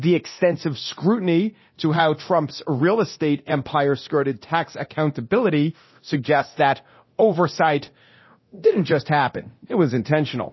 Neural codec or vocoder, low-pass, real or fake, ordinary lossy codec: codec, 24 kHz, 0.9 kbps, DualCodec; 7.2 kHz; fake; MP3, 24 kbps